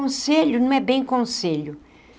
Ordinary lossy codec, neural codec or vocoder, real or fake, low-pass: none; none; real; none